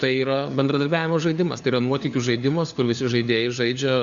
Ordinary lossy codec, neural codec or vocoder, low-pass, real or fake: Opus, 64 kbps; codec, 16 kHz, 4 kbps, FunCodec, trained on LibriTTS, 50 frames a second; 7.2 kHz; fake